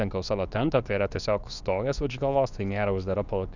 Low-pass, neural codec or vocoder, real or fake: 7.2 kHz; codec, 24 kHz, 0.9 kbps, WavTokenizer, medium speech release version 2; fake